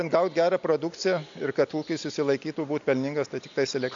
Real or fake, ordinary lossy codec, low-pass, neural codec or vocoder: real; AAC, 64 kbps; 7.2 kHz; none